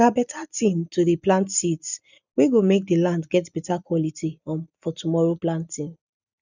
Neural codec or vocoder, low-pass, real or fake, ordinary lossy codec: none; 7.2 kHz; real; none